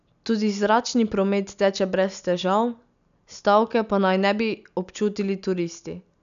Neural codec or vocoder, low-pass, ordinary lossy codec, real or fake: none; 7.2 kHz; none; real